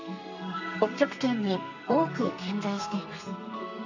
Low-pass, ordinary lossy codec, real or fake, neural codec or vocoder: 7.2 kHz; none; fake; codec, 32 kHz, 1.9 kbps, SNAC